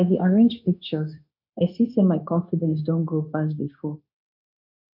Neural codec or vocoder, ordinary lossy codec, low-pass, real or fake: codec, 16 kHz, 0.9 kbps, LongCat-Audio-Codec; none; 5.4 kHz; fake